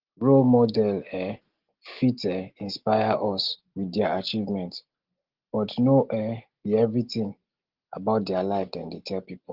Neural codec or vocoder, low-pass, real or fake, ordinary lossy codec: none; 5.4 kHz; real; Opus, 16 kbps